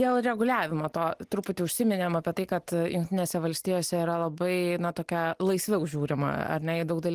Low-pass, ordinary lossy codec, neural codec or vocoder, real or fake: 14.4 kHz; Opus, 24 kbps; none; real